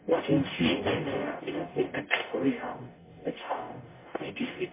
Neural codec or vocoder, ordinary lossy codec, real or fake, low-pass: codec, 44.1 kHz, 0.9 kbps, DAC; MP3, 16 kbps; fake; 3.6 kHz